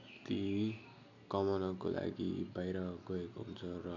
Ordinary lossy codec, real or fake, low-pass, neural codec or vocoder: none; real; 7.2 kHz; none